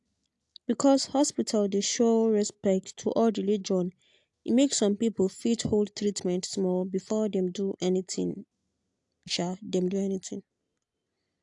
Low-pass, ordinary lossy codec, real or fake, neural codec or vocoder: 10.8 kHz; AAC, 64 kbps; real; none